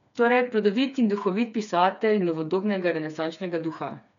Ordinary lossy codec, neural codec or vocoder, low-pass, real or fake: none; codec, 16 kHz, 4 kbps, FreqCodec, smaller model; 7.2 kHz; fake